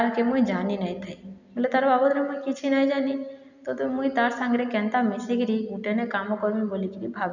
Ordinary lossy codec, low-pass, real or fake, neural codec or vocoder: none; 7.2 kHz; real; none